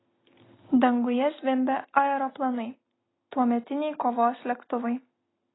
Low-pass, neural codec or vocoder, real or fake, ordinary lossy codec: 7.2 kHz; none; real; AAC, 16 kbps